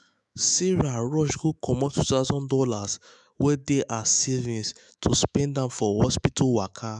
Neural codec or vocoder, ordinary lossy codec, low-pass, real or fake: autoencoder, 48 kHz, 128 numbers a frame, DAC-VAE, trained on Japanese speech; none; 10.8 kHz; fake